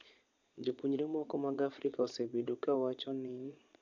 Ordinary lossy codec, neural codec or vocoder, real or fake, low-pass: MP3, 64 kbps; vocoder, 22.05 kHz, 80 mel bands, Vocos; fake; 7.2 kHz